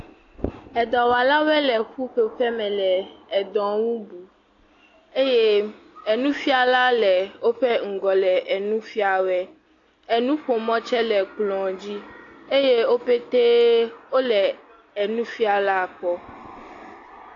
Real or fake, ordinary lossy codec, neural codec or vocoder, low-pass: real; AAC, 48 kbps; none; 7.2 kHz